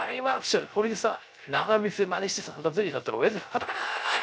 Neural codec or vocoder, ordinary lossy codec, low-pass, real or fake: codec, 16 kHz, 0.3 kbps, FocalCodec; none; none; fake